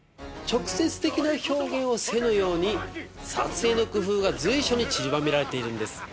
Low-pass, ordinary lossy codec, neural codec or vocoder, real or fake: none; none; none; real